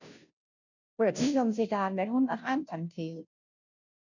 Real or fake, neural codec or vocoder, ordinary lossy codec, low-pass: fake; codec, 16 kHz, 0.5 kbps, FunCodec, trained on Chinese and English, 25 frames a second; none; 7.2 kHz